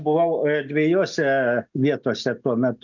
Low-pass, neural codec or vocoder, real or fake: 7.2 kHz; none; real